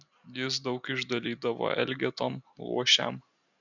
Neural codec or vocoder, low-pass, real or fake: none; 7.2 kHz; real